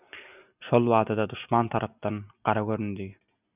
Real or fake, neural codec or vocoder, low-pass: real; none; 3.6 kHz